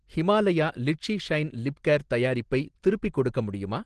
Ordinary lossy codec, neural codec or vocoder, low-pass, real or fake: Opus, 16 kbps; none; 10.8 kHz; real